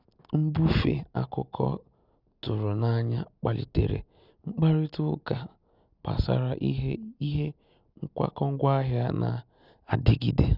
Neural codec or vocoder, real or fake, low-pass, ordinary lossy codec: none; real; 5.4 kHz; none